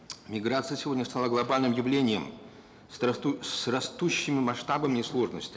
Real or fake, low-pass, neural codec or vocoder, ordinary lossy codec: real; none; none; none